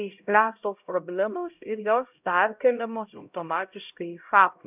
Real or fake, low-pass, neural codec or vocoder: fake; 3.6 kHz; codec, 16 kHz, 0.5 kbps, X-Codec, HuBERT features, trained on LibriSpeech